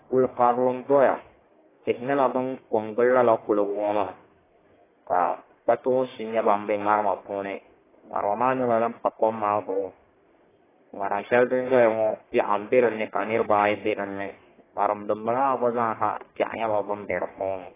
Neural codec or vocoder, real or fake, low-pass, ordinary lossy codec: codec, 44.1 kHz, 1.7 kbps, Pupu-Codec; fake; 3.6 kHz; AAC, 16 kbps